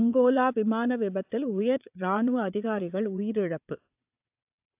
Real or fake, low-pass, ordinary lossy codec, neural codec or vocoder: fake; 3.6 kHz; AAC, 32 kbps; vocoder, 22.05 kHz, 80 mel bands, WaveNeXt